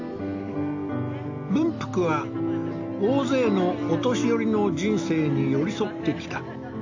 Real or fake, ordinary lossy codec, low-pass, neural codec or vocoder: real; AAC, 48 kbps; 7.2 kHz; none